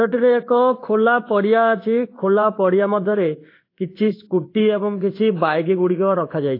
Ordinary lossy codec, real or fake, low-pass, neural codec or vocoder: AAC, 32 kbps; fake; 5.4 kHz; codec, 16 kHz in and 24 kHz out, 1 kbps, XY-Tokenizer